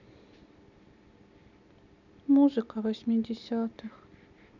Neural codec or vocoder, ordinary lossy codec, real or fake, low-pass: none; none; real; 7.2 kHz